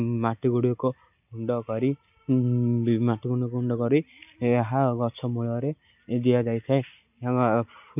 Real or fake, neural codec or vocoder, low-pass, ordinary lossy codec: real; none; 3.6 kHz; none